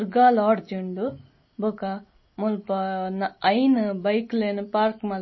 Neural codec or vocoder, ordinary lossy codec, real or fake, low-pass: none; MP3, 24 kbps; real; 7.2 kHz